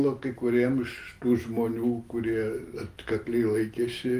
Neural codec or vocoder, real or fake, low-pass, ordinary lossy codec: none; real; 14.4 kHz; Opus, 24 kbps